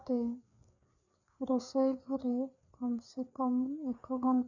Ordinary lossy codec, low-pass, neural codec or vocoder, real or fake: none; 7.2 kHz; codec, 16 kHz, 4 kbps, FreqCodec, larger model; fake